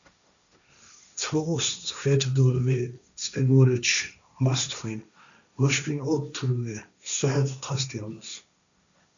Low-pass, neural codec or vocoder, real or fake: 7.2 kHz; codec, 16 kHz, 1.1 kbps, Voila-Tokenizer; fake